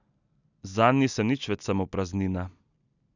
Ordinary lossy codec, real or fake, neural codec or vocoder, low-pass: none; real; none; 7.2 kHz